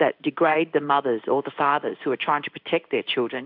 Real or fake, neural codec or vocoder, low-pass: fake; codec, 16 kHz in and 24 kHz out, 1 kbps, XY-Tokenizer; 5.4 kHz